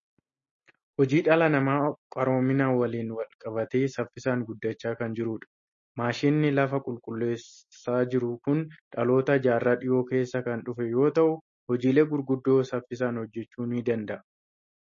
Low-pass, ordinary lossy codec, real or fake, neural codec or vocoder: 7.2 kHz; MP3, 32 kbps; real; none